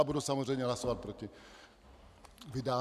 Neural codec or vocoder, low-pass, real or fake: none; 14.4 kHz; real